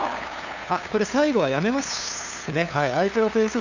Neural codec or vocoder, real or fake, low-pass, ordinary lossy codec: codec, 16 kHz, 2 kbps, FunCodec, trained on LibriTTS, 25 frames a second; fake; 7.2 kHz; none